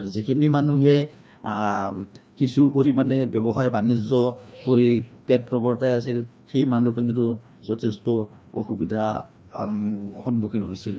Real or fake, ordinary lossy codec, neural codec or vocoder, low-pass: fake; none; codec, 16 kHz, 1 kbps, FreqCodec, larger model; none